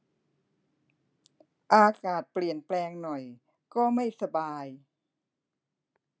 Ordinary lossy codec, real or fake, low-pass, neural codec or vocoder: none; real; none; none